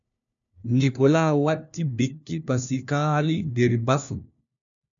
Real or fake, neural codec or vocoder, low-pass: fake; codec, 16 kHz, 1 kbps, FunCodec, trained on LibriTTS, 50 frames a second; 7.2 kHz